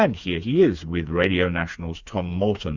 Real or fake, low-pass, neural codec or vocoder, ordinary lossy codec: fake; 7.2 kHz; codec, 16 kHz, 4 kbps, FreqCodec, smaller model; Opus, 64 kbps